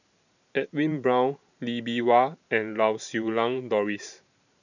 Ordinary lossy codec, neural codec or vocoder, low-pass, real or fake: none; vocoder, 44.1 kHz, 128 mel bands every 512 samples, BigVGAN v2; 7.2 kHz; fake